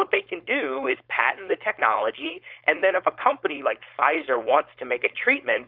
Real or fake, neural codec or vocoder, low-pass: fake; codec, 16 kHz, 4.8 kbps, FACodec; 5.4 kHz